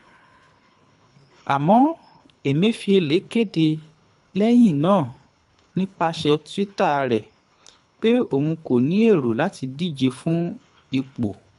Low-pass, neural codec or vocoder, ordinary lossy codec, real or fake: 10.8 kHz; codec, 24 kHz, 3 kbps, HILCodec; none; fake